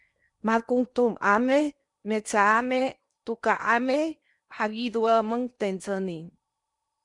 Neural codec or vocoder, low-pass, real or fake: codec, 16 kHz in and 24 kHz out, 0.8 kbps, FocalCodec, streaming, 65536 codes; 10.8 kHz; fake